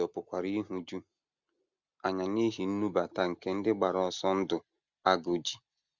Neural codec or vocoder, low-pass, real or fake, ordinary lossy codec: none; 7.2 kHz; real; Opus, 64 kbps